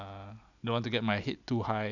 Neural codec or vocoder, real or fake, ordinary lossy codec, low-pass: none; real; none; 7.2 kHz